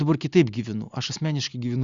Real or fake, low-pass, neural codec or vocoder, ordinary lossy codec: real; 7.2 kHz; none; Opus, 64 kbps